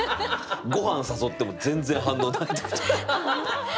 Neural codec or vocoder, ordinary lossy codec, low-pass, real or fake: none; none; none; real